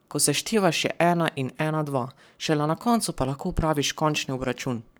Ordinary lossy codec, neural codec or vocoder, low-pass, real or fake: none; codec, 44.1 kHz, 7.8 kbps, Pupu-Codec; none; fake